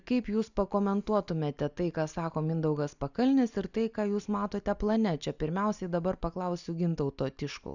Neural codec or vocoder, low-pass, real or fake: none; 7.2 kHz; real